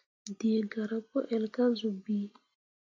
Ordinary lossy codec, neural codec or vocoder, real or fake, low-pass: AAC, 48 kbps; none; real; 7.2 kHz